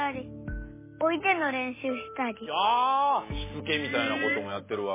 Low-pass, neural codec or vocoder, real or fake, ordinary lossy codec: 3.6 kHz; none; real; MP3, 16 kbps